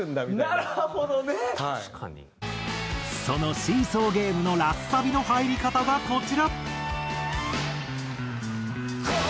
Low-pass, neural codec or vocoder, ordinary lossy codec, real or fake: none; none; none; real